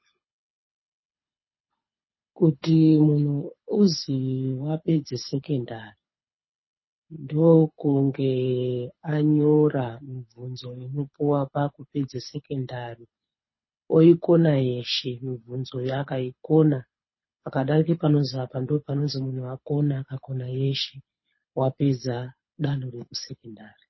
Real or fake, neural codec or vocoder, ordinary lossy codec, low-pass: fake; codec, 24 kHz, 6 kbps, HILCodec; MP3, 24 kbps; 7.2 kHz